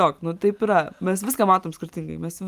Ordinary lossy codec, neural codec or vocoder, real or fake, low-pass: Opus, 32 kbps; none; real; 14.4 kHz